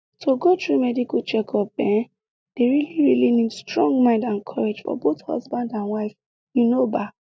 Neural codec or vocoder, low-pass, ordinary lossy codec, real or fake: none; none; none; real